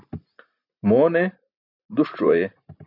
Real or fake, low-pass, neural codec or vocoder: real; 5.4 kHz; none